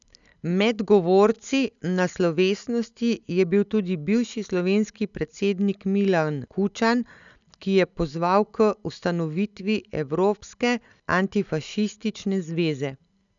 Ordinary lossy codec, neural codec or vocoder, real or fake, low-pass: none; none; real; 7.2 kHz